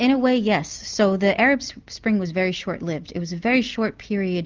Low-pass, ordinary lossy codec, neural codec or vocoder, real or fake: 7.2 kHz; Opus, 32 kbps; none; real